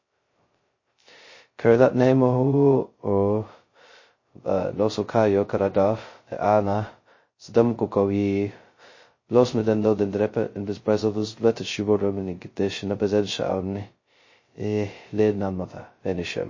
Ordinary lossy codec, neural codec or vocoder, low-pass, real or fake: MP3, 32 kbps; codec, 16 kHz, 0.2 kbps, FocalCodec; 7.2 kHz; fake